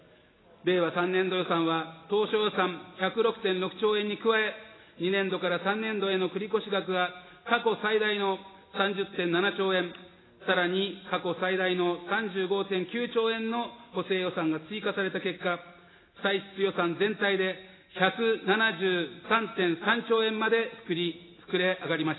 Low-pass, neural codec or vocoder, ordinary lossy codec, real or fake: 7.2 kHz; none; AAC, 16 kbps; real